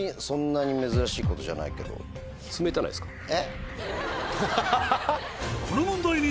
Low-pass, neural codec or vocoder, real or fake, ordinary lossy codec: none; none; real; none